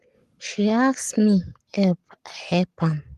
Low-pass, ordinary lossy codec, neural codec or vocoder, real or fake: 14.4 kHz; Opus, 24 kbps; codec, 44.1 kHz, 7.8 kbps, Pupu-Codec; fake